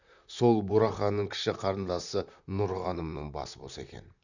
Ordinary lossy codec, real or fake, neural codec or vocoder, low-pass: none; fake; vocoder, 44.1 kHz, 128 mel bands, Pupu-Vocoder; 7.2 kHz